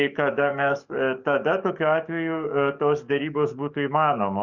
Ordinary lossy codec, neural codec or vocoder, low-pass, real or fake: Opus, 64 kbps; codec, 44.1 kHz, 7.8 kbps, DAC; 7.2 kHz; fake